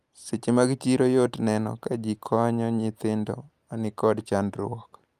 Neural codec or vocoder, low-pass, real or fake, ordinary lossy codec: none; 14.4 kHz; real; Opus, 32 kbps